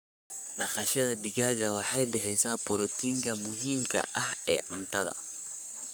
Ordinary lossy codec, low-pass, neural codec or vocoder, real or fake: none; none; codec, 44.1 kHz, 3.4 kbps, Pupu-Codec; fake